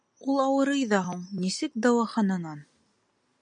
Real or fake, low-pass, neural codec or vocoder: real; 9.9 kHz; none